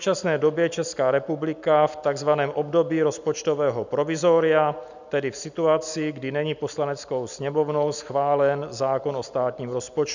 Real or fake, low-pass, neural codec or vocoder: real; 7.2 kHz; none